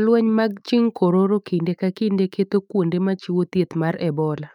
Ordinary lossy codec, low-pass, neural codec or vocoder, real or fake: none; 19.8 kHz; autoencoder, 48 kHz, 128 numbers a frame, DAC-VAE, trained on Japanese speech; fake